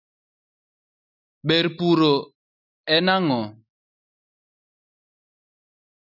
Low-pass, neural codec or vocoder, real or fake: 5.4 kHz; none; real